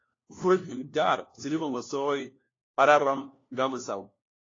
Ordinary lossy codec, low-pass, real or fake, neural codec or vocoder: AAC, 32 kbps; 7.2 kHz; fake; codec, 16 kHz, 1 kbps, FunCodec, trained on LibriTTS, 50 frames a second